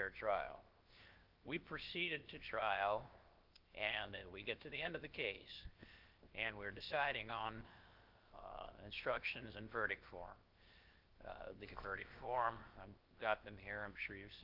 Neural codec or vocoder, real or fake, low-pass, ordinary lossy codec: codec, 16 kHz, 0.7 kbps, FocalCodec; fake; 5.4 kHz; Opus, 32 kbps